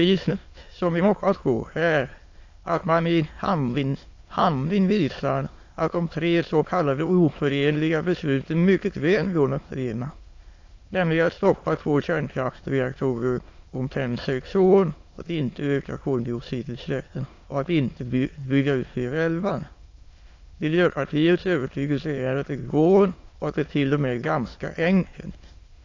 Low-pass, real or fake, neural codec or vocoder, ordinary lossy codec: 7.2 kHz; fake; autoencoder, 22.05 kHz, a latent of 192 numbers a frame, VITS, trained on many speakers; AAC, 48 kbps